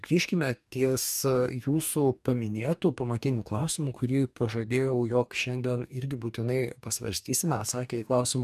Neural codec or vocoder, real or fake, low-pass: codec, 44.1 kHz, 2.6 kbps, DAC; fake; 14.4 kHz